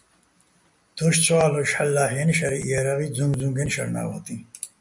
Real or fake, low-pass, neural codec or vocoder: real; 10.8 kHz; none